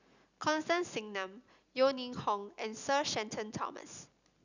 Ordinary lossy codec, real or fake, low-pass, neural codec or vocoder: none; real; 7.2 kHz; none